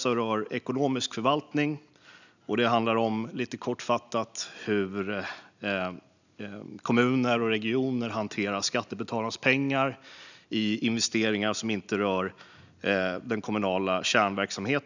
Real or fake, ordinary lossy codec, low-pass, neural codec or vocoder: real; none; 7.2 kHz; none